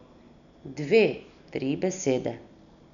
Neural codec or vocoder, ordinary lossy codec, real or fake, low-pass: none; none; real; 7.2 kHz